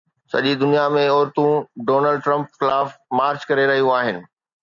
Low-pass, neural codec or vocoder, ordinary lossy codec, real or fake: 7.2 kHz; none; MP3, 96 kbps; real